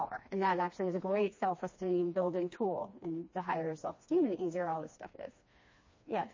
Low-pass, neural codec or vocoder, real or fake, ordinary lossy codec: 7.2 kHz; codec, 16 kHz, 2 kbps, FreqCodec, smaller model; fake; MP3, 32 kbps